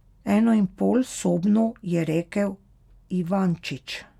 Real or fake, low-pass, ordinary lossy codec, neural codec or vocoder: real; 19.8 kHz; none; none